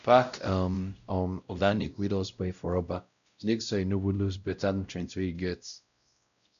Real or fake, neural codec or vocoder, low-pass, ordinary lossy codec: fake; codec, 16 kHz, 0.5 kbps, X-Codec, WavLM features, trained on Multilingual LibriSpeech; 7.2 kHz; none